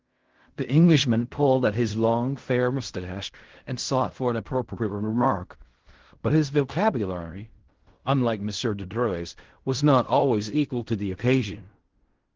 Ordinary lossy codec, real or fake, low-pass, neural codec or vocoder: Opus, 16 kbps; fake; 7.2 kHz; codec, 16 kHz in and 24 kHz out, 0.4 kbps, LongCat-Audio-Codec, fine tuned four codebook decoder